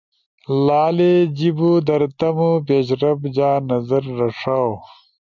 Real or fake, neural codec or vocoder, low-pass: real; none; 7.2 kHz